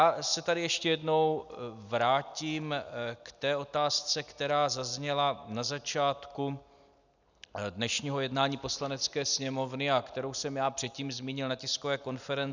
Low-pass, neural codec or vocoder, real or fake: 7.2 kHz; none; real